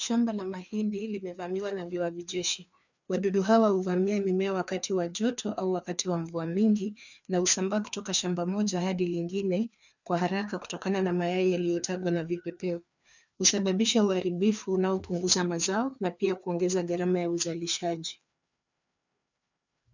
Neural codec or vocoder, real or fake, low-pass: codec, 16 kHz, 2 kbps, FreqCodec, larger model; fake; 7.2 kHz